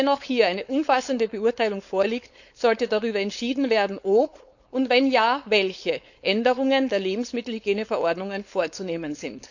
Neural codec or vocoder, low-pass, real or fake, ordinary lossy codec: codec, 16 kHz, 4.8 kbps, FACodec; 7.2 kHz; fake; none